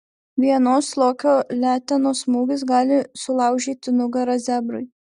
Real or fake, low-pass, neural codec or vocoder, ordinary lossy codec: real; 10.8 kHz; none; Opus, 64 kbps